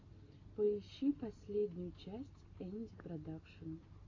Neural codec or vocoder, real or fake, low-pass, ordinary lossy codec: none; real; 7.2 kHz; AAC, 32 kbps